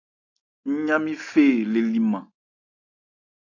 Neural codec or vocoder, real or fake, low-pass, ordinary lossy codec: none; real; 7.2 kHz; AAC, 48 kbps